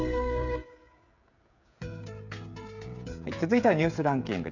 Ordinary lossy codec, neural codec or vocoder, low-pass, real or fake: none; codec, 16 kHz, 16 kbps, FreqCodec, smaller model; 7.2 kHz; fake